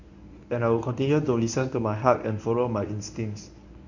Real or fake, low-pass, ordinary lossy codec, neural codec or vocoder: fake; 7.2 kHz; none; codec, 24 kHz, 0.9 kbps, WavTokenizer, medium speech release version 1